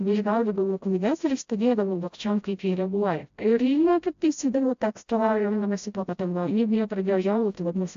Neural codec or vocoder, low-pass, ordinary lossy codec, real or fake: codec, 16 kHz, 0.5 kbps, FreqCodec, smaller model; 7.2 kHz; AAC, 64 kbps; fake